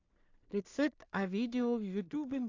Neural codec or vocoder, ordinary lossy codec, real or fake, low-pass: codec, 16 kHz in and 24 kHz out, 0.4 kbps, LongCat-Audio-Codec, two codebook decoder; AAC, 48 kbps; fake; 7.2 kHz